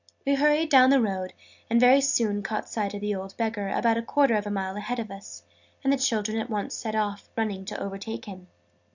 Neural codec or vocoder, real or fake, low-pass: none; real; 7.2 kHz